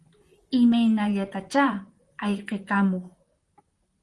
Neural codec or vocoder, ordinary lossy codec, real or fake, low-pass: vocoder, 44.1 kHz, 128 mel bands, Pupu-Vocoder; Opus, 32 kbps; fake; 10.8 kHz